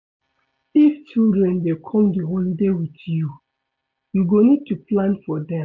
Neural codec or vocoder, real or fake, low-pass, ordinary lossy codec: none; real; 7.2 kHz; MP3, 48 kbps